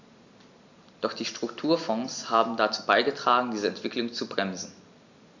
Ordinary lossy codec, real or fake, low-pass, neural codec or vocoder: none; real; 7.2 kHz; none